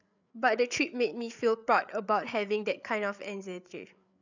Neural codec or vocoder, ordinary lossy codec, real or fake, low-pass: codec, 16 kHz, 8 kbps, FreqCodec, larger model; none; fake; 7.2 kHz